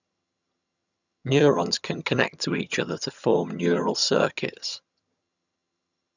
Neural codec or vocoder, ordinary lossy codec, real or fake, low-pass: vocoder, 22.05 kHz, 80 mel bands, HiFi-GAN; none; fake; 7.2 kHz